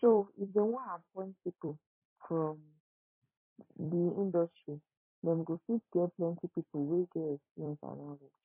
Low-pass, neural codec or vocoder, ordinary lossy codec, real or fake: 3.6 kHz; vocoder, 44.1 kHz, 128 mel bands every 256 samples, BigVGAN v2; MP3, 16 kbps; fake